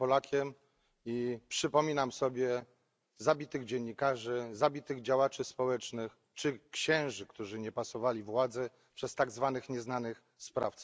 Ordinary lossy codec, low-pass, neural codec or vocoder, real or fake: none; none; none; real